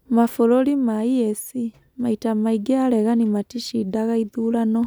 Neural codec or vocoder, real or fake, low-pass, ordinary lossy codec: none; real; none; none